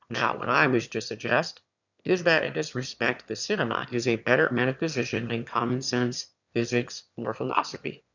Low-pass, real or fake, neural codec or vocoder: 7.2 kHz; fake; autoencoder, 22.05 kHz, a latent of 192 numbers a frame, VITS, trained on one speaker